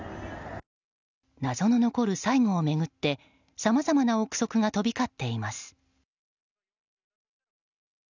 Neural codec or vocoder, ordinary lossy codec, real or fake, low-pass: none; none; real; 7.2 kHz